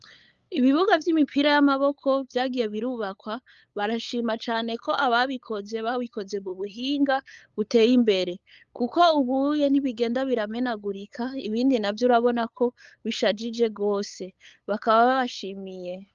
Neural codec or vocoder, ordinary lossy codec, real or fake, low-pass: codec, 16 kHz, 8 kbps, FunCodec, trained on LibriTTS, 25 frames a second; Opus, 32 kbps; fake; 7.2 kHz